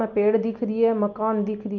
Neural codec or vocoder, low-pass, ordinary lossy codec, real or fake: none; 7.2 kHz; Opus, 24 kbps; real